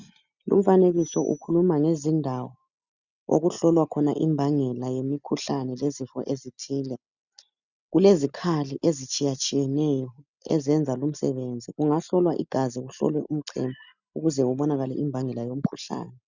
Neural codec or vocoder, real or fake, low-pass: none; real; 7.2 kHz